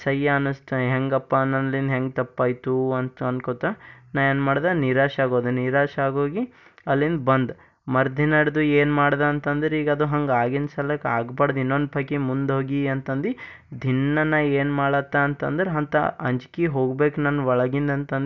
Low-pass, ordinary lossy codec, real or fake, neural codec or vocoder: 7.2 kHz; none; real; none